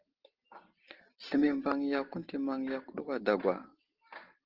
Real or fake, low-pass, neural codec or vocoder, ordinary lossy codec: real; 5.4 kHz; none; Opus, 16 kbps